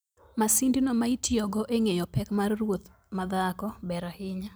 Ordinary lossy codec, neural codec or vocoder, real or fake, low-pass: none; none; real; none